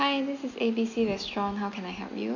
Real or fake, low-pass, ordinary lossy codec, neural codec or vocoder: real; 7.2 kHz; none; none